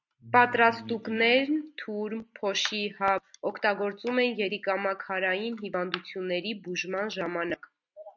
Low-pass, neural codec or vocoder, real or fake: 7.2 kHz; none; real